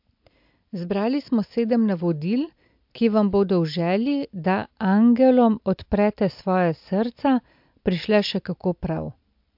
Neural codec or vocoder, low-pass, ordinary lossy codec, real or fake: none; 5.4 kHz; MP3, 48 kbps; real